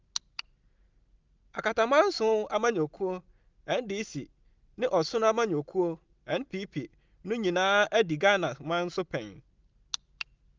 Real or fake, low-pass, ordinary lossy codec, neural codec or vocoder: real; 7.2 kHz; Opus, 24 kbps; none